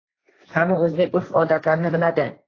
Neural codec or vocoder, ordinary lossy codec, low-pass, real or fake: codec, 16 kHz, 1.1 kbps, Voila-Tokenizer; AAC, 32 kbps; 7.2 kHz; fake